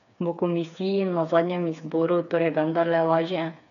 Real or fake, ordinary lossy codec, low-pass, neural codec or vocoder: fake; none; 7.2 kHz; codec, 16 kHz, 4 kbps, FreqCodec, smaller model